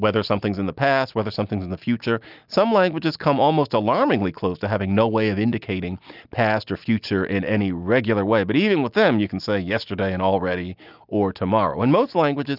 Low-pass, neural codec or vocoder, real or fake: 5.4 kHz; none; real